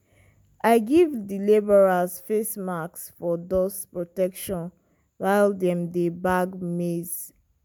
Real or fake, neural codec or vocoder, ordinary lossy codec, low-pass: real; none; none; none